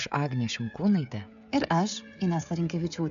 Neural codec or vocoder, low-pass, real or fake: codec, 16 kHz, 16 kbps, FreqCodec, smaller model; 7.2 kHz; fake